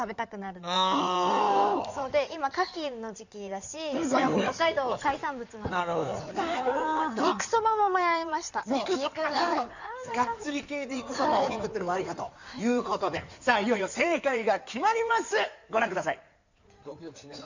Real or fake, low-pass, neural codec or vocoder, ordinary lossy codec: fake; 7.2 kHz; codec, 16 kHz in and 24 kHz out, 2.2 kbps, FireRedTTS-2 codec; none